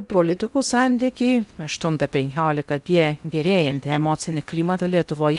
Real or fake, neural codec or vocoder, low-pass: fake; codec, 16 kHz in and 24 kHz out, 0.6 kbps, FocalCodec, streaming, 2048 codes; 10.8 kHz